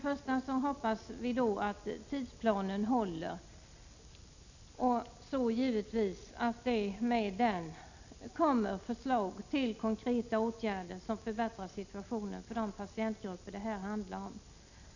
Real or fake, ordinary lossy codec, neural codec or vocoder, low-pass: real; none; none; 7.2 kHz